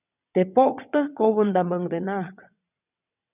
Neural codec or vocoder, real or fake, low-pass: vocoder, 22.05 kHz, 80 mel bands, WaveNeXt; fake; 3.6 kHz